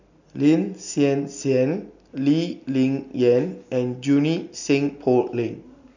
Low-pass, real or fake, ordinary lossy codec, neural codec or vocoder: 7.2 kHz; real; none; none